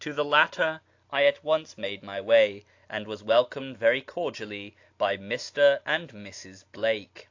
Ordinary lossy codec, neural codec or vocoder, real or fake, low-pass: AAC, 48 kbps; none; real; 7.2 kHz